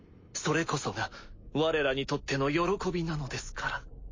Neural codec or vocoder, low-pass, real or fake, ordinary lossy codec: none; 7.2 kHz; real; MP3, 32 kbps